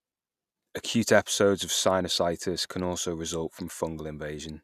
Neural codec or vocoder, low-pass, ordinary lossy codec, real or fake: none; 14.4 kHz; none; real